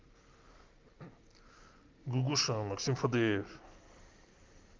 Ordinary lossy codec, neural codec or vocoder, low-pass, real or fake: Opus, 32 kbps; none; 7.2 kHz; real